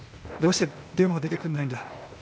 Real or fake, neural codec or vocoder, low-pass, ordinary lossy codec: fake; codec, 16 kHz, 0.8 kbps, ZipCodec; none; none